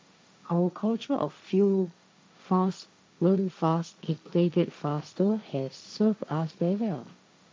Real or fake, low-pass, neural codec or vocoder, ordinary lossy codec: fake; none; codec, 16 kHz, 1.1 kbps, Voila-Tokenizer; none